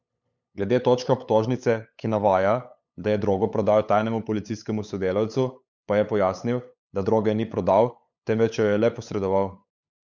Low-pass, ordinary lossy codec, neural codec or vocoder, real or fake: 7.2 kHz; none; codec, 16 kHz, 8 kbps, FunCodec, trained on LibriTTS, 25 frames a second; fake